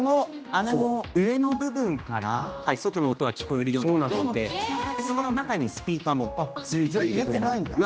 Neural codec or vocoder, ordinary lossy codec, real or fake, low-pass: codec, 16 kHz, 1 kbps, X-Codec, HuBERT features, trained on general audio; none; fake; none